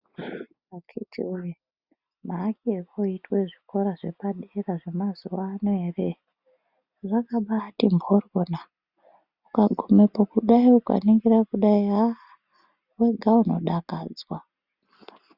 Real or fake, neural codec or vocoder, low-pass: real; none; 5.4 kHz